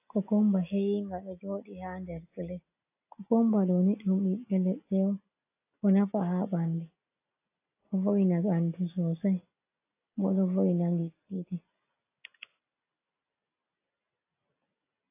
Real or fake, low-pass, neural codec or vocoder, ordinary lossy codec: real; 3.6 kHz; none; AAC, 24 kbps